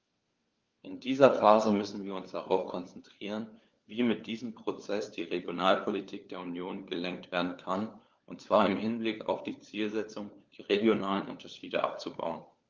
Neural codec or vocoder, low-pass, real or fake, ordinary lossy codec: codec, 16 kHz, 4 kbps, FunCodec, trained on LibriTTS, 50 frames a second; 7.2 kHz; fake; Opus, 24 kbps